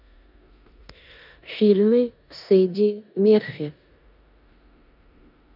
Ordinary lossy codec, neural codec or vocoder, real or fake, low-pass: none; codec, 16 kHz in and 24 kHz out, 0.9 kbps, LongCat-Audio-Codec, four codebook decoder; fake; 5.4 kHz